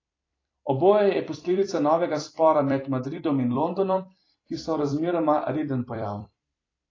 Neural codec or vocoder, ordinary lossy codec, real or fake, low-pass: vocoder, 44.1 kHz, 128 mel bands every 256 samples, BigVGAN v2; AAC, 32 kbps; fake; 7.2 kHz